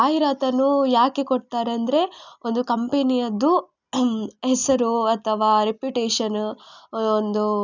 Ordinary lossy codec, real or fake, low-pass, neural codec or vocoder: none; real; 7.2 kHz; none